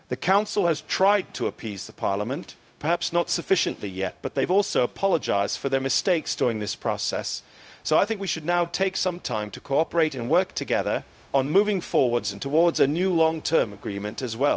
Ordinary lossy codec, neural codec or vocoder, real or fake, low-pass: none; codec, 16 kHz, 0.4 kbps, LongCat-Audio-Codec; fake; none